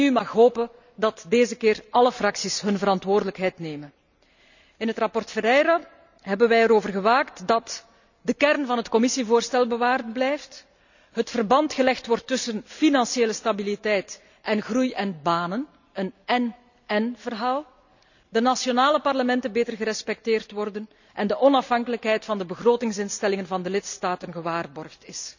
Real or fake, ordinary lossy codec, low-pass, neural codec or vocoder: real; none; 7.2 kHz; none